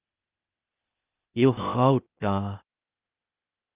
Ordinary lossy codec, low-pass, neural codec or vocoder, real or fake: Opus, 32 kbps; 3.6 kHz; codec, 16 kHz, 0.8 kbps, ZipCodec; fake